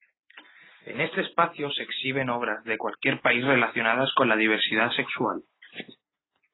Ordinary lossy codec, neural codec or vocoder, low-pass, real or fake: AAC, 16 kbps; none; 7.2 kHz; real